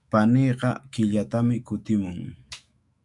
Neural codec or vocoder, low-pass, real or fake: autoencoder, 48 kHz, 128 numbers a frame, DAC-VAE, trained on Japanese speech; 10.8 kHz; fake